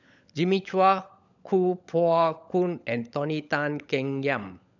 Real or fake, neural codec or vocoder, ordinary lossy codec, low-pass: fake; codec, 16 kHz, 16 kbps, FunCodec, trained on LibriTTS, 50 frames a second; none; 7.2 kHz